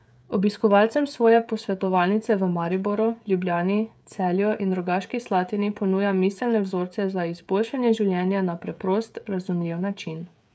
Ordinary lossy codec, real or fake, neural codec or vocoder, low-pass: none; fake; codec, 16 kHz, 16 kbps, FreqCodec, smaller model; none